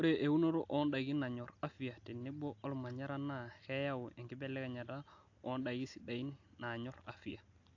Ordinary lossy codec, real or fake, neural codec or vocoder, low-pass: AAC, 48 kbps; real; none; 7.2 kHz